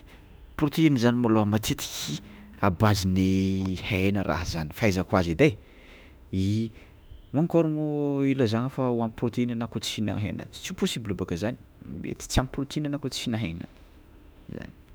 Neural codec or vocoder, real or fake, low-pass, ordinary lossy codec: autoencoder, 48 kHz, 32 numbers a frame, DAC-VAE, trained on Japanese speech; fake; none; none